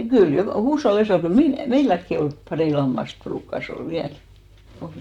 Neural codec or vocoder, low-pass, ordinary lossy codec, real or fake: vocoder, 44.1 kHz, 128 mel bands, Pupu-Vocoder; 19.8 kHz; none; fake